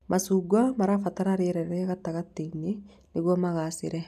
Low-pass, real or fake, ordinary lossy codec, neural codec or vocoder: 14.4 kHz; real; none; none